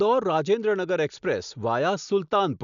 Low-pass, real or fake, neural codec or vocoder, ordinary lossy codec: 7.2 kHz; real; none; none